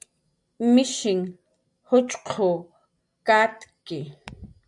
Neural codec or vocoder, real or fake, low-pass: none; real; 10.8 kHz